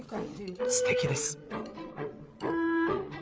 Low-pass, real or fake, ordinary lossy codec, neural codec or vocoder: none; fake; none; codec, 16 kHz, 8 kbps, FreqCodec, larger model